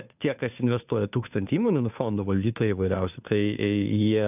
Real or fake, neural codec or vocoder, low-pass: fake; codec, 16 kHz, 2 kbps, FunCodec, trained on Chinese and English, 25 frames a second; 3.6 kHz